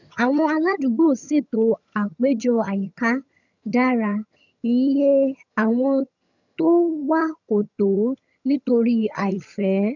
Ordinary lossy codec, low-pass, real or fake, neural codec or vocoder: none; 7.2 kHz; fake; vocoder, 22.05 kHz, 80 mel bands, HiFi-GAN